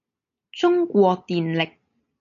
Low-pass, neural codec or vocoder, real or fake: 7.2 kHz; none; real